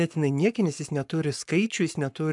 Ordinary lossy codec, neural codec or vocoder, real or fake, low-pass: MP3, 96 kbps; codec, 44.1 kHz, 7.8 kbps, Pupu-Codec; fake; 10.8 kHz